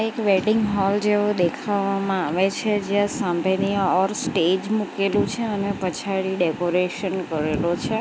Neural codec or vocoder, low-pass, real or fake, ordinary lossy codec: none; none; real; none